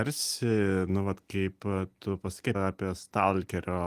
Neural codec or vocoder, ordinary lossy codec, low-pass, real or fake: none; Opus, 24 kbps; 14.4 kHz; real